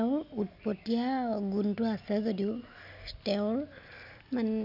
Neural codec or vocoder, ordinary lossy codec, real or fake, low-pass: none; none; real; 5.4 kHz